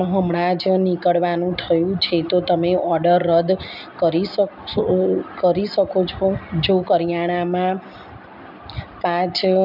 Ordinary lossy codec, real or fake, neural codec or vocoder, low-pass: none; real; none; 5.4 kHz